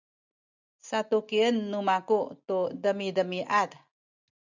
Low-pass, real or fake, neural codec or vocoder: 7.2 kHz; real; none